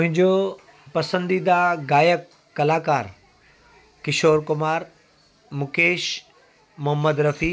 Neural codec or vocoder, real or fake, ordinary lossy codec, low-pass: none; real; none; none